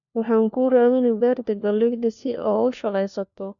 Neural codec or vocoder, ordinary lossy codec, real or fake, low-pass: codec, 16 kHz, 1 kbps, FunCodec, trained on LibriTTS, 50 frames a second; none; fake; 7.2 kHz